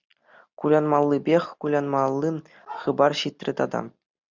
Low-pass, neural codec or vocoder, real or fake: 7.2 kHz; none; real